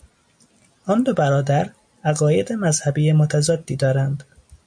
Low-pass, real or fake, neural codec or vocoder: 9.9 kHz; real; none